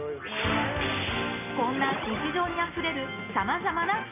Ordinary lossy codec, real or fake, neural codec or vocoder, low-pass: none; real; none; 3.6 kHz